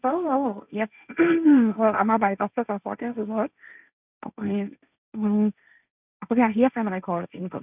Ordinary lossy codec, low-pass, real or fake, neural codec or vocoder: none; 3.6 kHz; fake; codec, 16 kHz, 1.1 kbps, Voila-Tokenizer